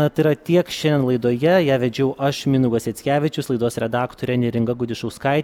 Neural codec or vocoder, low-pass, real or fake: none; 19.8 kHz; real